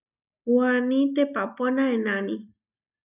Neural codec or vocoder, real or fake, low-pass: none; real; 3.6 kHz